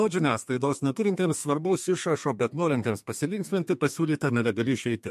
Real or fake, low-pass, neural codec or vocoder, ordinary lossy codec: fake; 14.4 kHz; codec, 32 kHz, 1.9 kbps, SNAC; MP3, 64 kbps